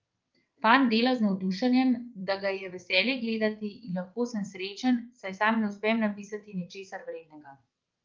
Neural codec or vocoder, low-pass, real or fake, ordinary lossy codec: vocoder, 22.05 kHz, 80 mel bands, WaveNeXt; 7.2 kHz; fake; Opus, 32 kbps